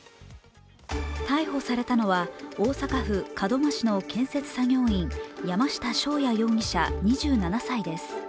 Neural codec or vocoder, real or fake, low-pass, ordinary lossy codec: none; real; none; none